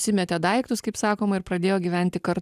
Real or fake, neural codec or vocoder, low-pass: real; none; 14.4 kHz